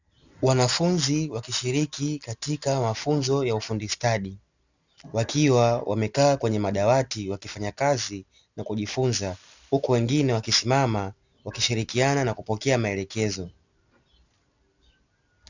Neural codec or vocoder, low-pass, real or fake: none; 7.2 kHz; real